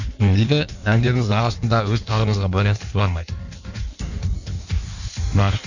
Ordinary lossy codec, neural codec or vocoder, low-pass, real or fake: none; codec, 16 kHz in and 24 kHz out, 1.1 kbps, FireRedTTS-2 codec; 7.2 kHz; fake